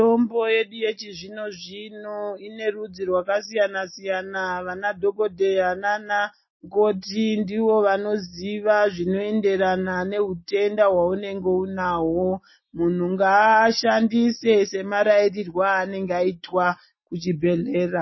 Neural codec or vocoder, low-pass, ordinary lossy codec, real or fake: none; 7.2 kHz; MP3, 24 kbps; real